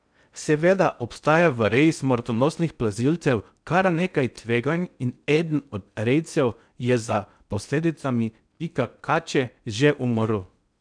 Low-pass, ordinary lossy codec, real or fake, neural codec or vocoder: 9.9 kHz; none; fake; codec, 16 kHz in and 24 kHz out, 0.6 kbps, FocalCodec, streaming, 2048 codes